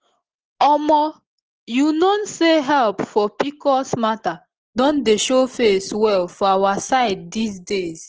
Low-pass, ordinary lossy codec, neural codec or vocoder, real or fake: 7.2 kHz; Opus, 16 kbps; none; real